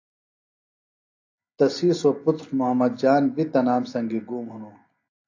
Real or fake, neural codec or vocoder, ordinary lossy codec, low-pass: real; none; AAC, 48 kbps; 7.2 kHz